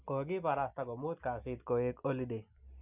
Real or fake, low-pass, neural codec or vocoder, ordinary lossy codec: real; 3.6 kHz; none; none